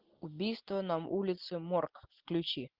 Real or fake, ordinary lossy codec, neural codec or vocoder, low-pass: real; Opus, 32 kbps; none; 5.4 kHz